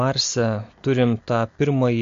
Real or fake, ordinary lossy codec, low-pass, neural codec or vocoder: real; MP3, 48 kbps; 7.2 kHz; none